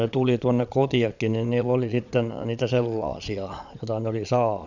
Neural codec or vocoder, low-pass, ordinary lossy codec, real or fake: vocoder, 22.05 kHz, 80 mel bands, Vocos; 7.2 kHz; none; fake